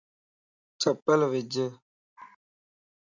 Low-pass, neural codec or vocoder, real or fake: 7.2 kHz; none; real